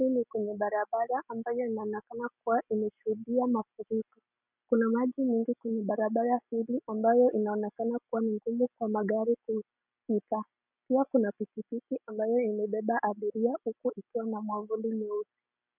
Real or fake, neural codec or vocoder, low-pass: real; none; 3.6 kHz